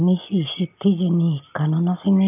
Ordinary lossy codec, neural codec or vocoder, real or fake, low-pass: none; none; real; 3.6 kHz